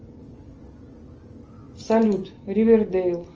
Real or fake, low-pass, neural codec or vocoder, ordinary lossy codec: real; 7.2 kHz; none; Opus, 24 kbps